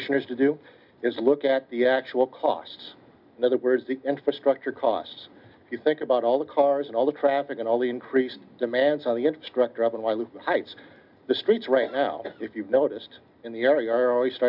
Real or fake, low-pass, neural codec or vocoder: real; 5.4 kHz; none